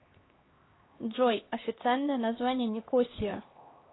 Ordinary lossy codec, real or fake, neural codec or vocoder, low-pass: AAC, 16 kbps; fake; codec, 16 kHz, 2 kbps, X-Codec, HuBERT features, trained on LibriSpeech; 7.2 kHz